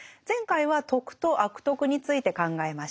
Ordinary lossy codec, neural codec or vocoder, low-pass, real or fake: none; none; none; real